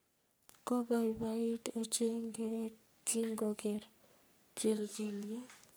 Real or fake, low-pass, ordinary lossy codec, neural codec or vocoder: fake; none; none; codec, 44.1 kHz, 3.4 kbps, Pupu-Codec